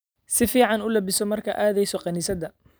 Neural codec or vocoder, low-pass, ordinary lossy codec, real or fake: none; none; none; real